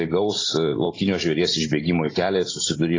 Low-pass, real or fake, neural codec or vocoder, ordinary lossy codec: 7.2 kHz; real; none; AAC, 32 kbps